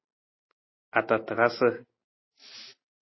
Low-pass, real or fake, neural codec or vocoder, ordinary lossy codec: 7.2 kHz; real; none; MP3, 24 kbps